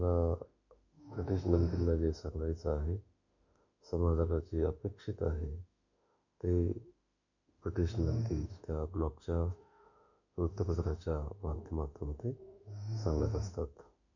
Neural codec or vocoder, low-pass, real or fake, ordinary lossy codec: autoencoder, 48 kHz, 32 numbers a frame, DAC-VAE, trained on Japanese speech; 7.2 kHz; fake; none